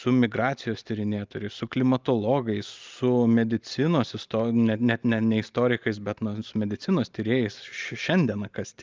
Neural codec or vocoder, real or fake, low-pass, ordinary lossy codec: none; real; 7.2 kHz; Opus, 32 kbps